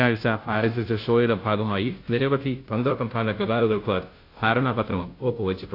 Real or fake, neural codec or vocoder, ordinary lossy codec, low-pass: fake; codec, 16 kHz, 0.5 kbps, FunCodec, trained on Chinese and English, 25 frames a second; AAC, 32 kbps; 5.4 kHz